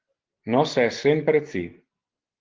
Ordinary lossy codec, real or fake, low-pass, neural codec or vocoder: Opus, 16 kbps; real; 7.2 kHz; none